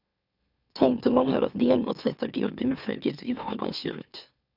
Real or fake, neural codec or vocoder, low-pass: fake; autoencoder, 44.1 kHz, a latent of 192 numbers a frame, MeloTTS; 5.4 kHz